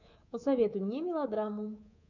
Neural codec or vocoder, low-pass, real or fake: codec, 24 kHz, 3.1 kbps, DualCodec; 7.2 kHz; fake